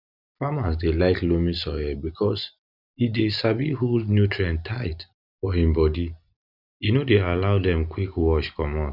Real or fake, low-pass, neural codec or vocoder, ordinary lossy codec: real; 5.4 kHz; none; none